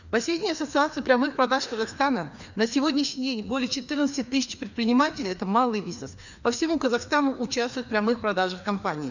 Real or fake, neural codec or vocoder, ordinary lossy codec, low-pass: fake; codec, 16 kHz, 2 kbps, FreqCodec, larger model; none; 7.2 kHz